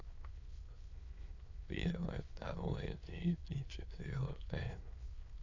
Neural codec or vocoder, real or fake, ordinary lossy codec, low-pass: autoencoder, 22.05 kHz, a latent of 192 numbers a frame, VITS, trained on many speakers; fake; AAC, 48 kbps; 7.2 kHz